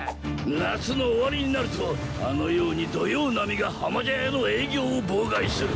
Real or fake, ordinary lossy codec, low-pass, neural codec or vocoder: real; none; none; none